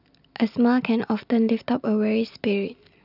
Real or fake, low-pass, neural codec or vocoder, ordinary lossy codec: real; 5.4 kHz; none; none